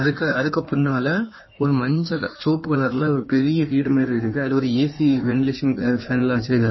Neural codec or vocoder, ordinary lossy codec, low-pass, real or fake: codec, 16 kHz in and 24 kHz out, 1.1 kbps, FireRedTTS-2 codec; MP3, 24 kbps; 7.2 kHz; fake